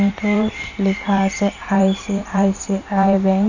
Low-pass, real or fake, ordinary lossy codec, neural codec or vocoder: 7.2 kHz; fake; none; vocoder, 44.1 kHz, 128 mel bands every 512 samples, BigVGAN v2